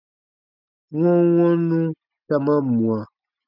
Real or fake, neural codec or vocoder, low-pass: real; none; 5.4 kHz